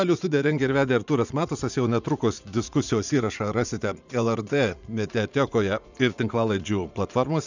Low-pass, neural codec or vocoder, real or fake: 7.2 kHz; vocoder, 44.1 kHz, 80 mel bands, Vocos; fake